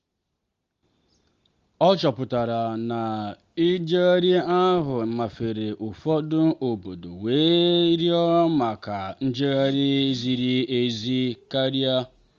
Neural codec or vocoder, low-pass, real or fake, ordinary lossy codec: none; 7.2 kHz; real; Opus, 32 kbps